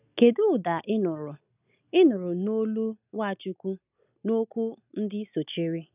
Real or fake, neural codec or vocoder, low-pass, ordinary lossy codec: real; none; 3.6 kHz; none